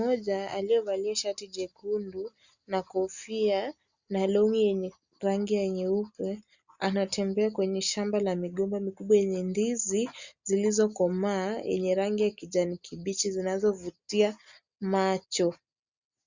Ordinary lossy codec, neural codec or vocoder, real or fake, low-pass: Opus, 64 kbps; none; real; 7.2 kHz